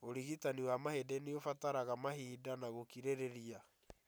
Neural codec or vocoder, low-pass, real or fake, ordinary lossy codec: none; none; real; none